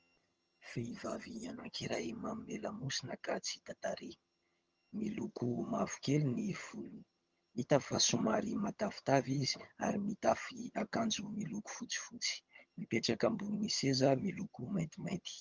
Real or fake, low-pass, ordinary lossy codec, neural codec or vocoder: fake; 7.2 kHz; Opus, 24 kbps; vocoder, 22.05 kHz, 80 mel bands, HiFi-GAN